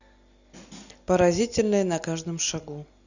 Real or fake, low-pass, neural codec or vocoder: real; 7.2 kHz; none